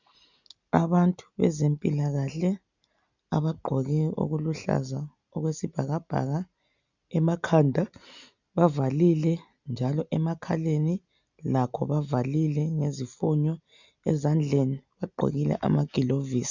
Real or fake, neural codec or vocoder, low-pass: real; none; 7.2 kHz